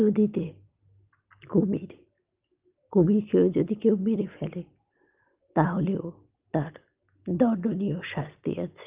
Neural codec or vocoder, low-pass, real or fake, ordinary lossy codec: none; 3.6 kHz; real; Opus, 24 kbps